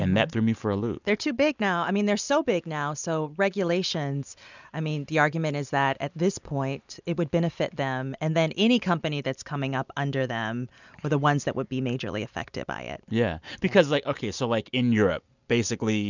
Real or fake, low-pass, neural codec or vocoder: real; 7.2 kHz; none